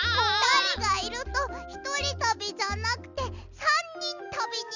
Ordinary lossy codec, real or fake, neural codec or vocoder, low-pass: none; real; none; 7.2 kHz